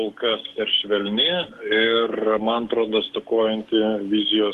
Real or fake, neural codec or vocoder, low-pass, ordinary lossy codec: fake; codec, 44.1 kHz, 7.8 kbps, DAC; 14.4 kHz; Opus, 16 kbps